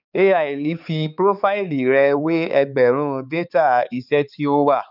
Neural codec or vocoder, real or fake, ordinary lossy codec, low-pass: codec, 16 kHz, 4 kbps, X-Codec, HuBERT features, trained on balanced general audio; fake; none; 5.4 kHz